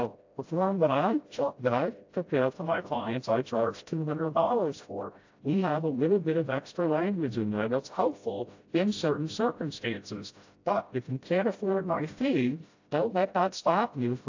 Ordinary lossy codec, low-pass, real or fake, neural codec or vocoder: AAC, 48 kbps; 7.2 kHz; fake; codec, 16 kHz, 0.5 kbps, FreqCodec, smaller model